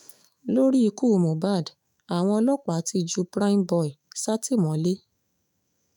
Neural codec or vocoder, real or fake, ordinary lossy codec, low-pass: autoencoder, 48 kHz, 128 numbers a frame, DAC-VAE, trained on Japanese speech; fake; none; 19.8 kHz